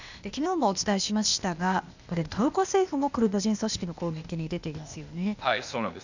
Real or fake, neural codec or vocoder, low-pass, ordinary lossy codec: fake; codec, 16 kHz, 0.8 kbps, ZipCodec; 7.2 kHz; none